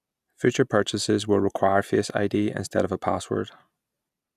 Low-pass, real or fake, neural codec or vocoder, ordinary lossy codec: 14.4 kHz; real; none; none